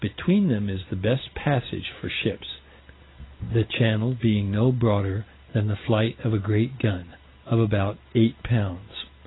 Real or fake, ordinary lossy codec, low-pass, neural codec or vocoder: real; AAC, 16 kbps; 7.2 kHz; none